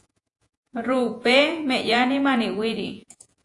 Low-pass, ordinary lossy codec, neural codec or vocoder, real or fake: 10.8 kHz; AAC, 64 kbps; vocoder, 48 kHz, 128 mel bands, Vocos; fake